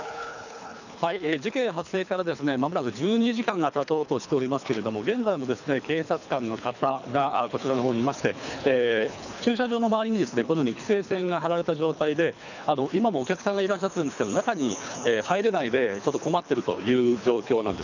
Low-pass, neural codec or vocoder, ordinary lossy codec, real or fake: 7.2 kHz; codec, 24 kHz, 3 kbps, HILCodec; none; fake